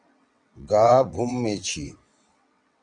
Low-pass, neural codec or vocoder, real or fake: 9.9 kHz; vocoder, 22.05 kHz, 80 mel bands, WaveNeXt; fake